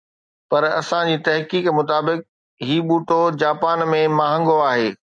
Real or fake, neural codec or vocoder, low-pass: real; none; 9.9 kHz